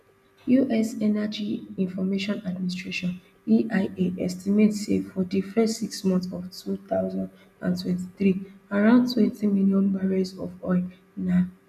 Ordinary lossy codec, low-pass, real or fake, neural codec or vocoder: none; 14.4 kHz; real; none